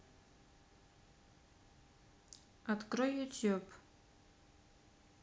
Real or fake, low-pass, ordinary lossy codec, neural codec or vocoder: real; none; none; none